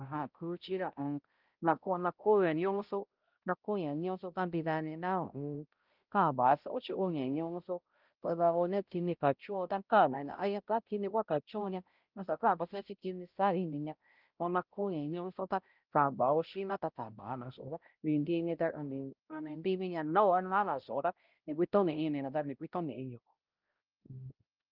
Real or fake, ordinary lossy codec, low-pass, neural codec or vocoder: fake; Opus, 32 kbps; 5.4 kHz; codec, 16 kHz, 0.5 kbps, X-Codec, HuBERT features, trained on balanced general audio